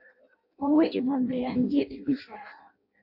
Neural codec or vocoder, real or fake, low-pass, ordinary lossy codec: codec, 16 kHz in and 24 kHz out, 0.6 kbps, FireRedTTS-2 codec; fake; 5.4 kHz; AAC, 48 kbps